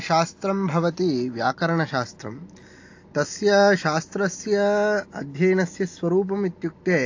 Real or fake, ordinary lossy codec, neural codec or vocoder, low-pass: real; AAC, 48 kbps; none; 7.2 kHz